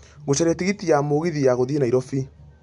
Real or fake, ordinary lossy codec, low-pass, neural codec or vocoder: real; none; 10.8 kHz; none